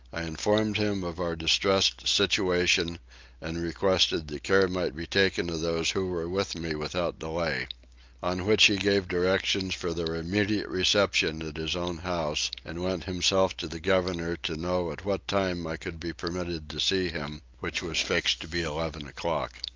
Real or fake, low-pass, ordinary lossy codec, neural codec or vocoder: real; 7.2 kHz; Opus, 24 kbps; none